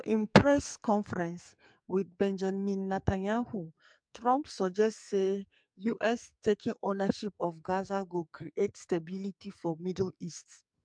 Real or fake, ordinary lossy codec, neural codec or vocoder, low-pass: fake; MP3, 64 kbps; codec, 44.1 kHz, 2.6 kbps, SNAC; 9.9 kHz